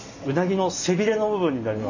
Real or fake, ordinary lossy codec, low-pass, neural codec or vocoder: real; none; 7.2 kHz; none